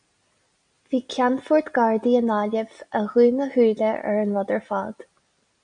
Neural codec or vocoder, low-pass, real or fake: none; 9.9 kHz; real